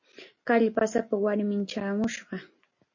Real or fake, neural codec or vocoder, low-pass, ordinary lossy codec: real; none; 7.2 kHz; MP3, 32 kbps